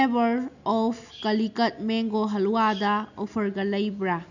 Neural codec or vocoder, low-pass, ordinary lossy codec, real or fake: none; 7.2 kHz; none; real